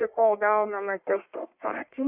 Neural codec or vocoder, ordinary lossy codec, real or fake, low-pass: codec, 44.1 kHz, 1.7 kbps, Pupu-Codec; Opus, 64 kbps; fake; 3.6 kHz